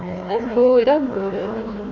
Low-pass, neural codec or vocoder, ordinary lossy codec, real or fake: 7.2 kHz; codec, 16 kHz, 1 kbps, FunCodec, trained on Chinese and English, 50 frames a second; none; fake